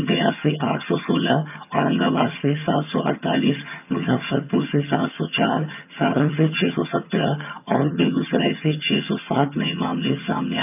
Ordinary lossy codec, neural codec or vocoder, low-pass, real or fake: none; vocoder, 22.05 kHz, 80 mel bands, HiFi-GAN; 3.6 kHz; fake